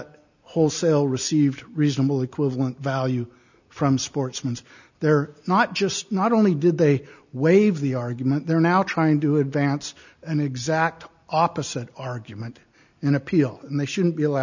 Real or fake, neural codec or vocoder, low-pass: real; none; 7.2 kHz